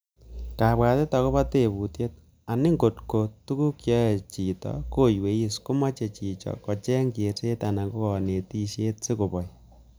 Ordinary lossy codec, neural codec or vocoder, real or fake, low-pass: none; none; real; none